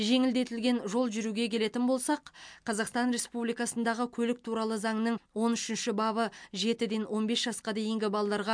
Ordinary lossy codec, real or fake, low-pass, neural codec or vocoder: MP3, 64 kbps; real; 9.9 kHz; none